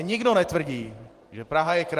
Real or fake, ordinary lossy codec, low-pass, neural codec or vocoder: fake; Opus, 24 kbps; 14.4 kHz; vocoder, 44.1 kHz, 128 mel bands every 512 samples, BigVGAN v2